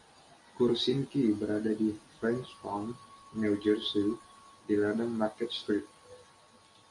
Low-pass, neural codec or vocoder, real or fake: 10.8 kHz; none; real